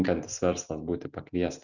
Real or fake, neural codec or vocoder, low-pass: real; none; 7.2 kHz